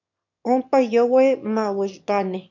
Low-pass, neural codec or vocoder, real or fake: 7.2 kHz; autoencoder, 22.05 kHz, a latent of 192 numbers a frame, VITS, trained on one speaker; fake